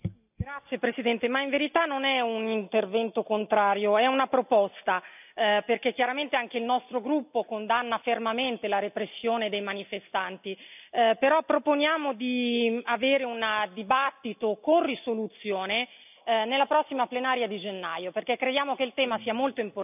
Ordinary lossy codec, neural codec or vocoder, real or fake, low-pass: none; none; real; 3.6 kHz